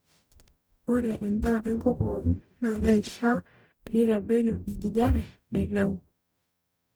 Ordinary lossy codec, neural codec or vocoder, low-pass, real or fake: none; codec, 44.1 kHz, 0.9 kbps, DAC; none; fake